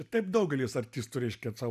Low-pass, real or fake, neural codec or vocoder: 14.4 kHz; real; none